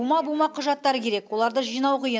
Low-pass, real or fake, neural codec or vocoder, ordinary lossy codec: none; real; none; none